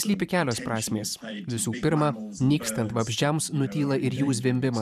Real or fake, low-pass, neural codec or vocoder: fake; 14.4 kHz; vocoder, 44.1 kHz, 128 mel bands every 512 samples, BigVGAN v2